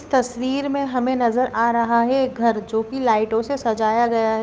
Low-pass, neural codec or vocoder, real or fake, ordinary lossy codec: none; codec, 16 kHz, 8 kbps, FunCodec, trained on Chinese and English, 25 frames a second; fake; none